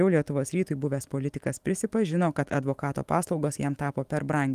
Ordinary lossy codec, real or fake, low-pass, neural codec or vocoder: Opus, 24 kbps; fake; 14.4 kHz; autoencoder, 48 kHz, 128 numbers a frame, DAC-VAE, trained on Japanese speech